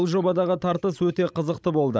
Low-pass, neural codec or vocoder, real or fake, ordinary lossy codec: none; codec, 16 kHz, 16 kbps, FreqCodec, larger model; fake; none